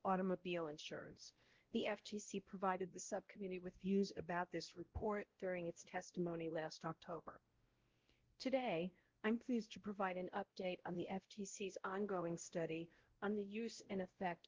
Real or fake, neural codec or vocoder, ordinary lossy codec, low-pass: fake; codec, 16 kHz, 0.5 kbps, X-Codec, WavLM features, trained on Multilingual LibriSpeech; Opus, 16 kbps; 7.2 kHz